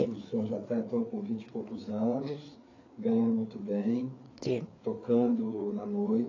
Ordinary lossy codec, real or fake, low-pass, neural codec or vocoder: AAC, 32 kbps; fake; 7.2 kHz; codec, 16 kHz, 4 kbps, FreqCodec, larger model